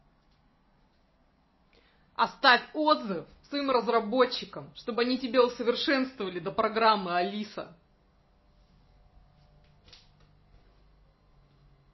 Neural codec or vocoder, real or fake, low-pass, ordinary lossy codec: none; real; 7.2 kHz; MP3, 24 kbps